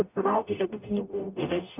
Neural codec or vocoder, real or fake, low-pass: codec, 44.1 kHz, 0.9 kbps, DAC; fake; 3.6 kHz